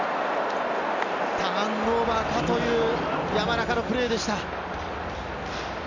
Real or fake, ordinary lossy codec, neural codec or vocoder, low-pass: real; none; none; 7.2 kHz